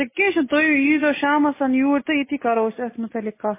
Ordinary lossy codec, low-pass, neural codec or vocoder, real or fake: MP3, 16 kbps; 3.6 kHz; none; real